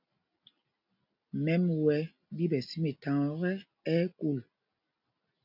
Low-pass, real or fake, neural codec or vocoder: 5.4 kHz; real; none